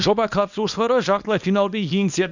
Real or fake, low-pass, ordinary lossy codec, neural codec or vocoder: fake; 7.2 kHz; none; codec, 24 kHz, 0.9 kbps, WavTokenizer, small release